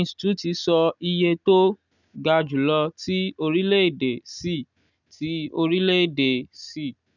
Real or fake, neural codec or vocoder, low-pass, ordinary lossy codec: real; none; 7.2 kHz; none